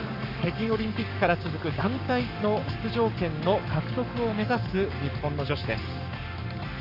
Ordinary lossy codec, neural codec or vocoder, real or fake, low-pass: none; codec, 44.1 kHz, 7.8 kbps, Pupu-Codec; fake; 5.4 kHz